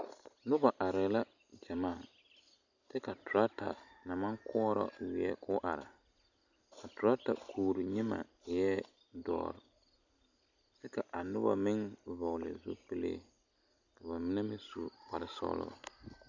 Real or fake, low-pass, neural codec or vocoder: real; 7.2 kHz; none